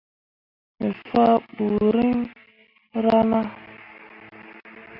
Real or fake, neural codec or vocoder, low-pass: real; none; 5.4 kHz